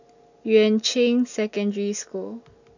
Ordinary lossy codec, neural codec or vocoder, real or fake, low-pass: none; none; real; 7.2 kHz